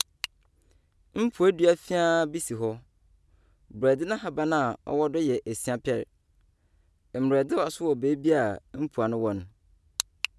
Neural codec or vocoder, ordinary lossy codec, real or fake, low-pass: none; none; real; none